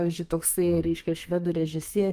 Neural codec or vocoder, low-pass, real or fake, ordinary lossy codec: codec, 32 kHz, 1.9 kbps, SNAC; 14.4 kHz; fake; Opus, 32 kbps